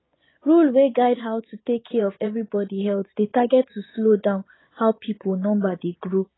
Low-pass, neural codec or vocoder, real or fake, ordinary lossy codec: 7.2 kHz; vocoder, 24 kHz, 100 mel bands, Vocos; fake; AAC, 16 kbps